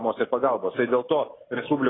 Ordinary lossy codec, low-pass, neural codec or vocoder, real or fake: AAC, 16 kbps; 7.2 kHz; none; real